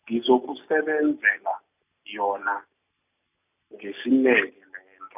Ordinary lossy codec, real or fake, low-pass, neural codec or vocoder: none; real; 3.6 kHz; none